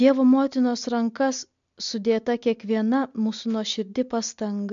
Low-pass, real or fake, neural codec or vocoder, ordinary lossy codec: 7.2 kHz; real; none; MP3, 64 kbps